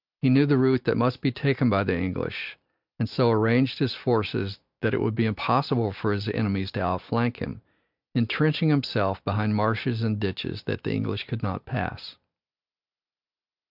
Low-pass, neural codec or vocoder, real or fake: 5.4 kHz; none; real